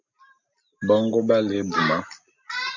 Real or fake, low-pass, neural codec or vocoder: real; 7.2 kHz; none